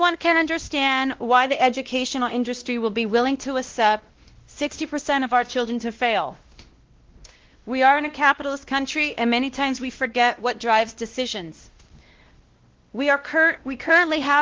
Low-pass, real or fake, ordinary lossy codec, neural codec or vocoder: 7.2 kHz; fake; Opus, 16 kbps; codec, 16 kHz, 1 kbps, X-Codec, WavLM features, trained on Multilingual LibriSpeech